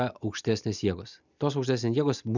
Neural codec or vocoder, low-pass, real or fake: none; 7.2 kHz; real